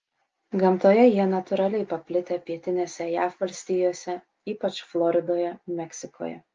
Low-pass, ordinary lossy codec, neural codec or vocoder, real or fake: 7.2 kHz; Opus, 24 kbps; none; real